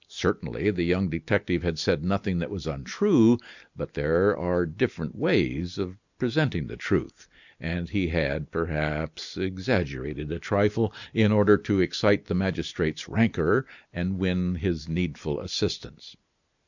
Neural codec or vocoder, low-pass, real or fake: none; 7.2 kHz; real